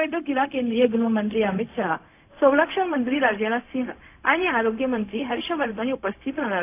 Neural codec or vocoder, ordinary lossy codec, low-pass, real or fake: codec, 16 kHz, 0.4 kbps, LongCat-Audio-Codec; AAC, 24 kbps; 3.6 kHz; fake